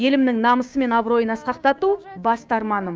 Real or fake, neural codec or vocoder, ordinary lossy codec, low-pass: fake; codec, 16 kHz, 6 kbps, DAC; none; none